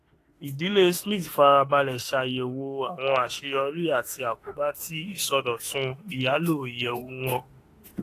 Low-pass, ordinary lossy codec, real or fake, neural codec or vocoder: 14.4 kHz; AAC, 48 kbps; fake; autoencoder, 48 kHz, 32 numbers a frame, DAC-VAE, trained on Japanese speech